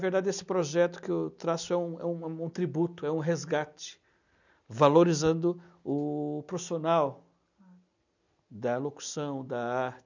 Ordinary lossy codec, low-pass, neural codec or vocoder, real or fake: none; 7.2 kHz; none; real